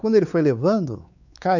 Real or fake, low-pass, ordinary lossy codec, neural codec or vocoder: fake; 7.2 kHz; none; codec, 16 kHz, 4 kbps, X-Codec, WavLM features, trained on Multilingual LibriSpeech